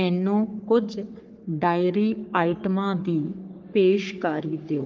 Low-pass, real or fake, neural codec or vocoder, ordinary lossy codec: 7.2 kHz; fake; codec, 44.1 kHz, 3.4 kbps, Pupu-Codec; Opus, 24 kbps